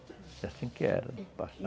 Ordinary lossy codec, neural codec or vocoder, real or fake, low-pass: none; none; real; none